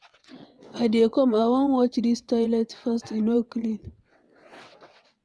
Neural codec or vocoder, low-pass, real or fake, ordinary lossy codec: vocoder, 22.05 kHz, 80 mel bands, WaveNeXt; none; fake; none